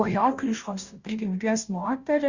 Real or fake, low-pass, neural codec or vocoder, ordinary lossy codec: fake; 7.2 kHz; codec, 16 kHz, 0.5 kbps, FunCodec, trained on Chinese and English, 25 frames a second; Opus, 64 kbps